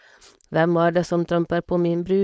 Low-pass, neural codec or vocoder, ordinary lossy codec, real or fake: none; codec, 16 kHz, 4.8 kbps, FACodec; none; fake